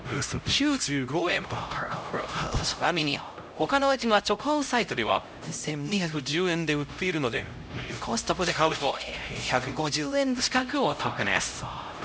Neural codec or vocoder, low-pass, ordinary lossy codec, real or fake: codec, 16 kHz, 0.5 kbps, X-Codec, HuBERT features, trained on LibriSpeech; none; none; fake